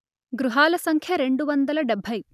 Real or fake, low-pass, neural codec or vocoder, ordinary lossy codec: real; 14.4 kHz; none; none